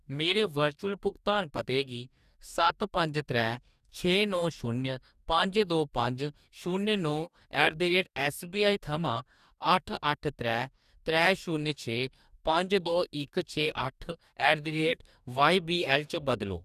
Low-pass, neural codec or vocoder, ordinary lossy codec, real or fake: 14.4 kHz; codec, 44.1 kHz, 2.6 kbps, DAC; none; fake